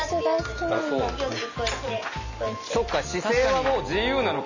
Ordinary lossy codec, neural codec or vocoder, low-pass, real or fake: none; none; 7.2 kHz; real